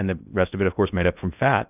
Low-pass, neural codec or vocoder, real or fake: 3.6 kHz; codec, 16 kHz in and 24 kHz out, 0.6 kbps, FocalCodec, streaming, 2048 codes; fake